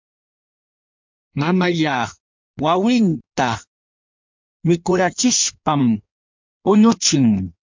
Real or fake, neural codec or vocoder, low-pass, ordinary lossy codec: fake; codec, 16 kHz in and 24 kHz out, 1.1 kbps, FireRedTTS-2 codec; 7.2 kHz; MP3, 64 kbps